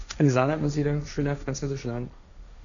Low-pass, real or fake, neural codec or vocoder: 7.2 kHz; fake; codec, 16 kHz, 1.1 kbps, Voila-Tokenizer